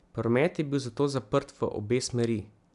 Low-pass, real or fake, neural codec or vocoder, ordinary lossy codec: 10.8 kHz; real; none; none